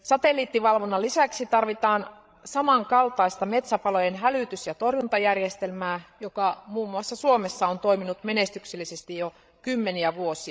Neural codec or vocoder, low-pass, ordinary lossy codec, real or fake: codec, 16 kHz, 16 kbps, FreqCodec, larger model; none; none; fake